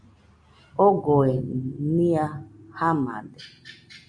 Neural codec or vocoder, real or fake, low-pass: none; real; 9.9 kHz